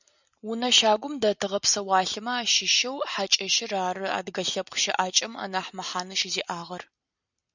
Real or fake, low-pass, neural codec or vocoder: real; 7.2 kHz; none